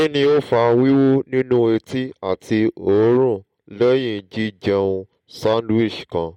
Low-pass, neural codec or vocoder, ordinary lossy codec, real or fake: 19.8 kHz; none; MP3, 64 kbps; real